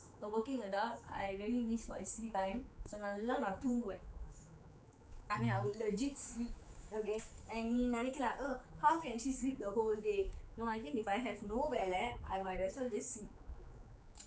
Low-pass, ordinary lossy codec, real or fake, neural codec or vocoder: none; none; fake; codec, 16 kHz, 4 kbps, X-Codec, HuBERT features, trained on general audio